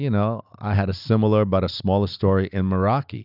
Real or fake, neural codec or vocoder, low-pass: real; none; 5.4 kHz